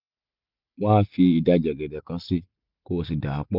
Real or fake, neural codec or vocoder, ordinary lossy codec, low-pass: real; none; none; 5.4 kHz